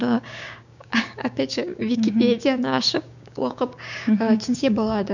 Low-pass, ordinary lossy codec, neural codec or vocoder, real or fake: 7.2 kHz; none; codec, 16 kHz, 6 kbps, DAC; fake